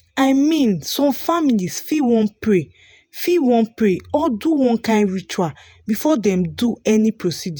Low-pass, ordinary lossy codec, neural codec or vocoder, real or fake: none; none; vocoder, 48 kHz, 128 mel bands, Vocos; fake